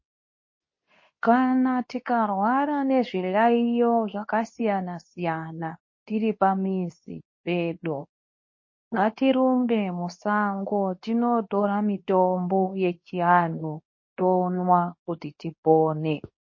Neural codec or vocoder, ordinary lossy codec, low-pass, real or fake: codec, 24 kHz, 0.9 kbps, WavTokenizer, medium speech release version 2; MP3, 32 kbps; 7.2 kHz; fake